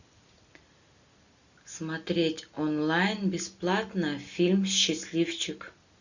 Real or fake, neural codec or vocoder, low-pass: real; none; 7.2 kHz